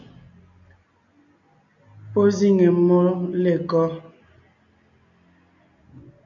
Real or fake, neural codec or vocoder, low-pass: real; none; 7.2 kHz